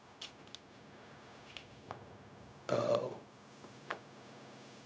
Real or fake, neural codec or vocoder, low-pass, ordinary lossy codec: fake; codec, 16 kHz, 0.4 kbps, LongCat-Audio-Codec; none; none